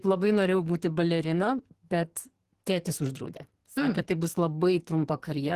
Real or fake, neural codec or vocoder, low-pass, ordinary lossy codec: fake; codec, 44.1 kHz, 2.6 kbps, SNAC; 14.4 kHz; Opus, 16 kbps